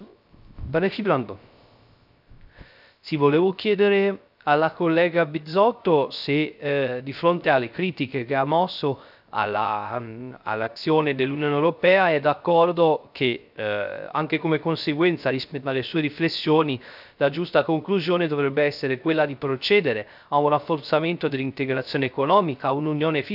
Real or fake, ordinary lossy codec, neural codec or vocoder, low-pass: fake; none; codec, 16 kHz, 0.3 kbps, FocalCodec; 5.4 kHz